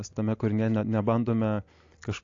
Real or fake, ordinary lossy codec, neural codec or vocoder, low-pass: real; AAC, 48 kbps; none; 7.2 kHz